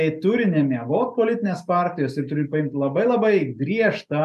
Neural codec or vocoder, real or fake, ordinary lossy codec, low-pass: none; real; MP3, 96 kbps; 14.4 kHz